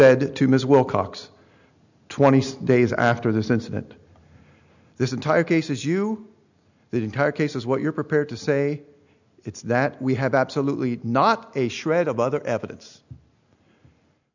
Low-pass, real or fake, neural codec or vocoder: 7.2 kHz; real; none